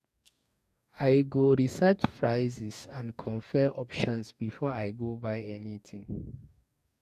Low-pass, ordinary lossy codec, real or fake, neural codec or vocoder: 14.4 kHz; none; fake; codec, 44.1 kHz, 2.6 kbps, DAC